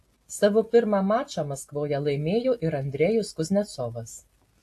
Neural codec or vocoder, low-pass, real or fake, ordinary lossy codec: none; 14.4 kHz; real; AAC, 64 kbps